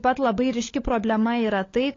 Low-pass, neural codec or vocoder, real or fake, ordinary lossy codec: 7.2 kHz; codec, 16 kHz, 16 kbps, FunCodec, trained on LibriTTS, 50 frames a second; fake; AAC, 32 kbps